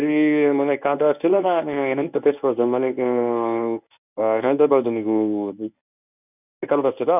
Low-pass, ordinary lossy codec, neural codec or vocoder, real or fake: 3.6 kHz; none; codec, 24 kHz, 0.9 kbps, WavTokenizer, medium speech release version 1; fake